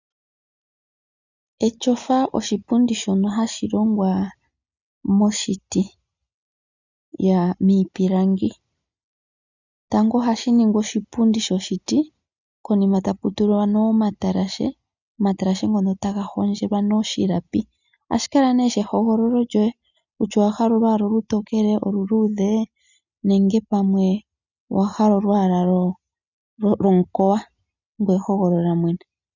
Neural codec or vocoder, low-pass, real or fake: none; 7.2 kHz; real